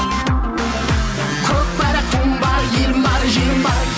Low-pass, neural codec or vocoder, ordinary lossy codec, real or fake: none; none; none; real